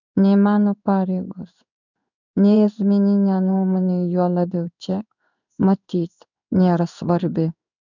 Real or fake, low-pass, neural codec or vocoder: fake; 7.2 kHz; codec, 16 kHz in and 24 kHz out, 1 kbps, XY-Tokenizer